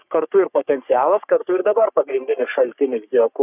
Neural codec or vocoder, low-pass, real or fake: codec, 44.1 kHz, 3.4 kbps, Pupu-Codec; 3.6 kHz; fake